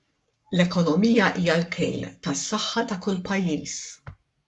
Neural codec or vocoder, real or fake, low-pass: codec, 44.1 kHz, 7.8 kbps, Pupu-Codec; fake; 10.8 kHz